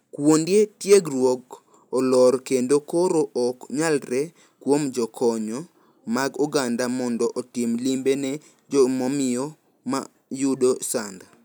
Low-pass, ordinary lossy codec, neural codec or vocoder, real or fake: none; none; none; real